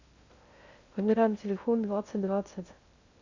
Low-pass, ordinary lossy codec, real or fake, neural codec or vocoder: 7.2 kHz; Opus, 64 kbps; fake; codec, 16 kHz in and 24 kHz out, 0.6 kbps, FocalCodec, streaming, 2048 codes